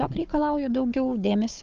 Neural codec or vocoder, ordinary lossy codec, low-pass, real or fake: codec, 16 kHz, 4 kbps, FunCodec, trained on Chinese and English, 50 frames a second; Opus, 24 kbps; 7.2 kHz; fake